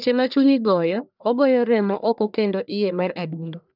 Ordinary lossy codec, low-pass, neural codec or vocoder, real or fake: none; 5.4 kHz; codec, 44.1 kHz, 1.7 kbps, Pupu-Codec; fake